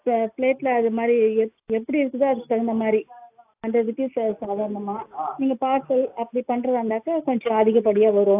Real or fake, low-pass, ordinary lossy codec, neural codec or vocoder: real; 3.6 kHz; AAC, 32 kbps; none